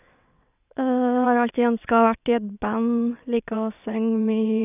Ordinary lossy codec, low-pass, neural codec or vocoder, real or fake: none; 3.6 kHz; vocoder, 22.05 kHz, 80 mel bands, WaveNeXt; fake